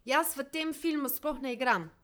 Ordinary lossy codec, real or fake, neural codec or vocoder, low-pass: none; fake; vocoder, 44.1 kHz, 128 mel bands, Pupu-Vocoder; none